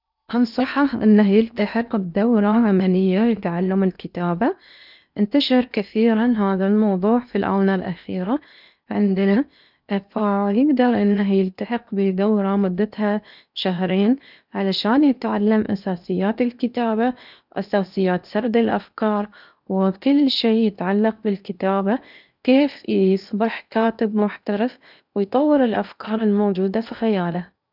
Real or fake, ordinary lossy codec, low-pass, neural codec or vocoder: fake; none; 5.4 kHz; codec, 16 kHz in and 24 kHz out, 0.8 kbps, FocalCodec, streaming, 65536 codes